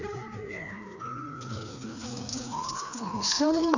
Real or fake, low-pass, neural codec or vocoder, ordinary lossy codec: fake; 7.2 kHz; codec, 16 kHz, 2 kbps, FreqCodec, larger model; none